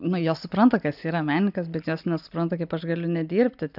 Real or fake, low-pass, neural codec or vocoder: real; 5.4 kHz; none